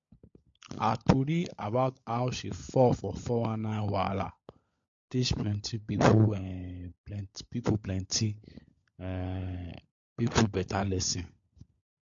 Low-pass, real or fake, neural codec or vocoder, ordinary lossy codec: 7.2 kHz; fake; codec, 16 kHz, 16 kbps, FunCodec, trained on LibriTTS, 50 frames a second; MP3, 48 kbps